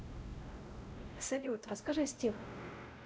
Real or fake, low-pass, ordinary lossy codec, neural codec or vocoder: fake; none; none; codec, 16 kHz, 0.5 kbps, X-Codec, WavLM features, trained on Multilingual LibriSpeech